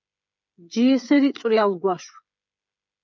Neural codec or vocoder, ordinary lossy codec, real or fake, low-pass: codec, 16 kHz, 16 kbps, FreqCodec, smaller model; MP3, 64 kbps; fake; 7.2 kHz